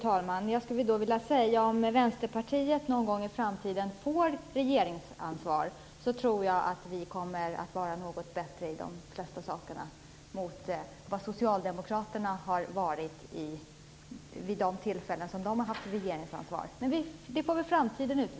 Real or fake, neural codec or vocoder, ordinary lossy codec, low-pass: real; none; none; none